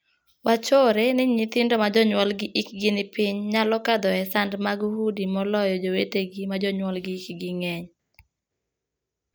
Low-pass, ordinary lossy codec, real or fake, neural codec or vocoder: none; none; real; none